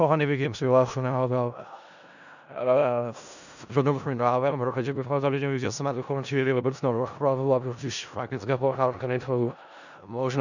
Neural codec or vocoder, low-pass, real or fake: codec, 16 kHz in and 24 kHz out, 0.4 kbps, LongCat-Audio-Codec, four codebook decoder; 7.2 kHz; fake